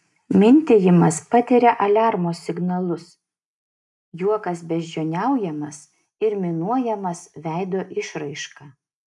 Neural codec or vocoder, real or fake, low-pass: none; real; 10.8 kHz